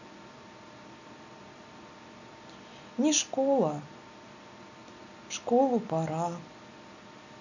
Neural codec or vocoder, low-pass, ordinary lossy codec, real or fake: none; 7.2 kHz; none; real